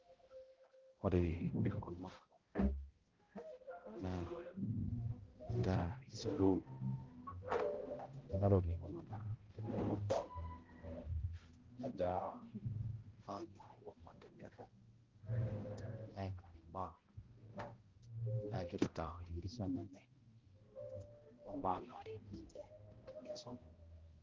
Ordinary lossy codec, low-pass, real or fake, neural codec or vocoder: Opus, 16 kbps; 7.2 kHz; fake; codec, 16 kHz, 0.5 kbps, X-Codec, HuBERT features, trained on balanced general audio